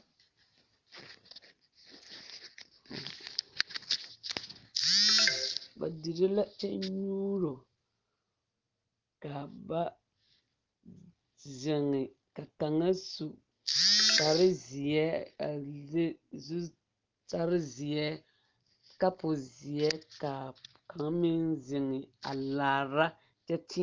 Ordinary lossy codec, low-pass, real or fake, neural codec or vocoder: Opus, 32 kbps; 7.2 kHz; real; none